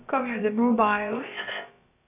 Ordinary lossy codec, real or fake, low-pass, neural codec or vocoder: AAC, 24 kbps; fake; 3.6 kHz; codec, 16 kHz, about 1 kbps, DyCAST, with the encoder's durations